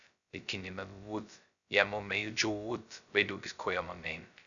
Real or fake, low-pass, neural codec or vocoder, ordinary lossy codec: fake; 7.2 kHz; codec, 16 kHz, 0.2 kbps, FocalCodec; none